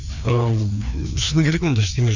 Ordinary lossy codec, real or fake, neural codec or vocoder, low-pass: none; fake; codec, 16 kHz, 2 kbps, FreqCodec, larger model; 7.2 kHz